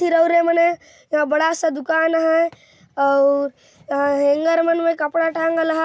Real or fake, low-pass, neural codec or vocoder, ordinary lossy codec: real; none; none; none